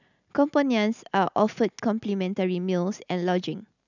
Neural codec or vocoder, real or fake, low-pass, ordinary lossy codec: none; real; 7.2 kHz; none